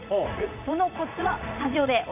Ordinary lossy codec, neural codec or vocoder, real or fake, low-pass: none; vocoder, 44.1 kHz, 80 mel bands, Vocos; fake; 3.6 kHz